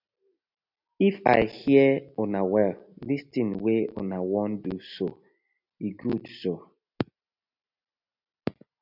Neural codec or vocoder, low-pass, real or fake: none; 5.4 kHz; real